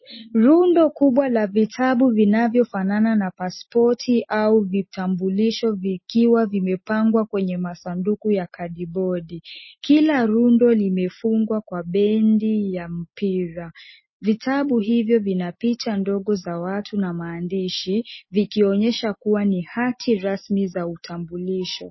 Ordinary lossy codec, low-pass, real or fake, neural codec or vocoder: MP3, 24 kbps; 7.2 kHz; real; none